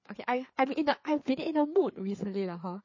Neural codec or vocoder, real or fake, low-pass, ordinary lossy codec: codec, 16 kHz, 4 kbps, FreqCodec, larger model; fake; 7.2 kHz; MP3, 32 kbps